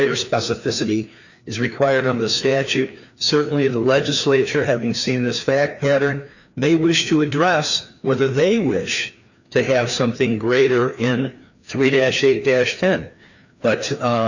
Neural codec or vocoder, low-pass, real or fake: codec, 16 kHz, 2 kbps, FreqCodec, larger model; 7.2 kHz; fake